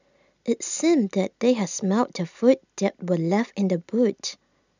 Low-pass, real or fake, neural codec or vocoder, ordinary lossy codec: 7.2 kHz; real; none; none